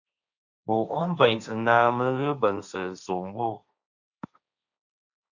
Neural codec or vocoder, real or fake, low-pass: codec, 16 kHz, 1.1 kbps, Voila-Tokenizer; fake; 7.2 kHz